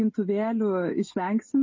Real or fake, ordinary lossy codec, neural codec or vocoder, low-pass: real; MP3, 32 kbps; none; 7.2 kHz